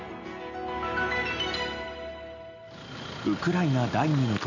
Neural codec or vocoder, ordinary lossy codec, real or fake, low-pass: none; none; real; 7.2 kHz